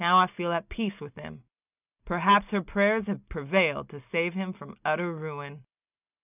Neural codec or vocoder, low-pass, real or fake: none; 3.6 kHz; real